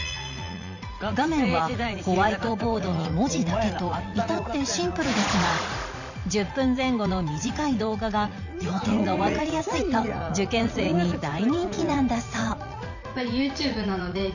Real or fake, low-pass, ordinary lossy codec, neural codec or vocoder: fake; 7.2 kHz; none; vocoder, 44.1 kHz, 80 mel bands, Vocos